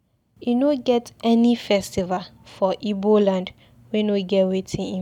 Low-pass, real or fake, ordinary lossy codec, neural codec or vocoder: 19.8 kHz; real; none; none